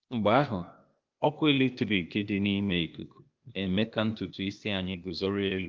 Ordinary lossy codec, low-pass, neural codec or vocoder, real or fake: Opus, 32 kbps; 7.2 kHz; codec, 16 kHz, 0.8 kbps, ZipCodec; fake